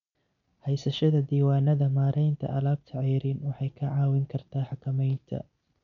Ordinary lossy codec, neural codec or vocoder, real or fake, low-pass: none; none; real; 7.2 kHz